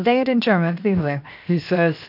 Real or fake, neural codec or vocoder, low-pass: fake; codec, 16 kHz, 0.7 kbps, FocalCodec; 5.4 kHz